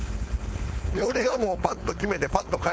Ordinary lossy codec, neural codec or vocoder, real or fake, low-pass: none; codec, 16 kHz, 4.8 kbps, FACodec; fake; none